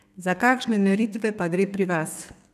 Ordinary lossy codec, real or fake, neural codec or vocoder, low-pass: none; fake; codec, 32 kHz, 1.9 kbps, SNAC; 14.4 kHz